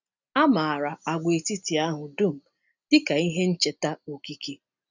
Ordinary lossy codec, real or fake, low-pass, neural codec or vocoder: none; real; 7.2 kHz; none